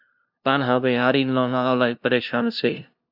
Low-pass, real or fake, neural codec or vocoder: 5.4 kHz; fake; codec, 16 kHz, 0.5 kbps, FunCodec, trained on LibriTTS, 25 frames a second